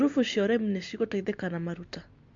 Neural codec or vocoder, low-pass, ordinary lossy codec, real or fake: none; 7.2 kHz; MP3, 48 kbps; real